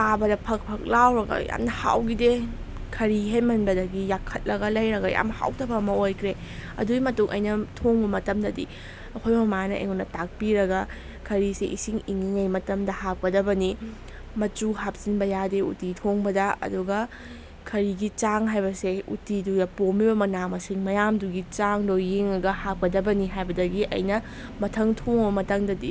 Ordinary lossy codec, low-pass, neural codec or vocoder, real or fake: none; none; none; real